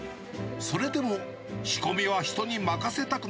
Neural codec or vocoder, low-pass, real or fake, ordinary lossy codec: none; none; real; none